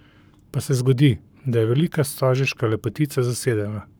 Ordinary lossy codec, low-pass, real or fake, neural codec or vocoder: none; none; fake; codec, 44.1 kHz, 7.8 kbps, Pupu-Codec